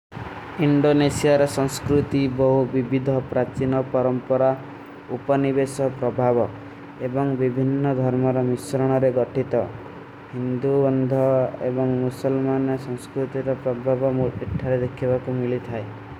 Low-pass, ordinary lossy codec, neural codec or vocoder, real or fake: 19.8 kHz; none; none; real